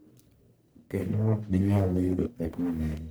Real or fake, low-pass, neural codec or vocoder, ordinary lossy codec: fake; none; codec, 44.1 kHz, 1.7 kbps, Pupu-Codec; none